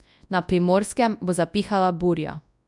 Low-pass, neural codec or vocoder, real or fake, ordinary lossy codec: 10.8 kHz; codec, 24 kHz, 0.9 kbps, WavTokenizer, large speech release; fake; none